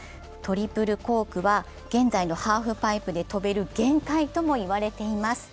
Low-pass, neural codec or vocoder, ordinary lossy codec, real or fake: none; none; none; real